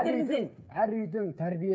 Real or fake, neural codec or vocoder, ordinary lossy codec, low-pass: fake; codec, 16 kHz, 8 kbps, FreqCodec, smaller model; none; none